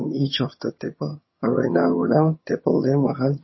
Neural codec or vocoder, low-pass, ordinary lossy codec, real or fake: vocoder, 22.05 kHz, 80 mel bands, HiFi-GAN; 7.2 kHz; MP3, 24 kbps; fake